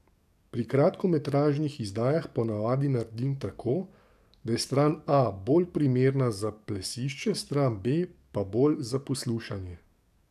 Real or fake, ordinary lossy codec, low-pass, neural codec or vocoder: fake; none; 14.4 kHz; codec, 44.1 kHz, 7.8 kbps, DAC